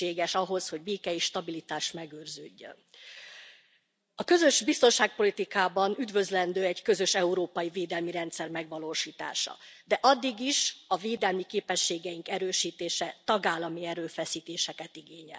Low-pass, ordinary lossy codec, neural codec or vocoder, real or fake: none; none; none; real